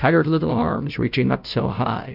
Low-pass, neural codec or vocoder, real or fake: 5.4 kHz; codec, 16 kHz, 0.5 kbps, FunCodec, trained on Chinese and English, 25 frames a second; fake